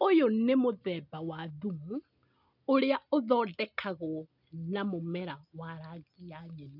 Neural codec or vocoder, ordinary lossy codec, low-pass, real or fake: none; none; 5.4 kHz; real